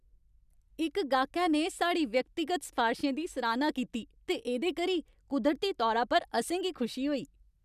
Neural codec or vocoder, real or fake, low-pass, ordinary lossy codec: none; real; 14.4 kHz; none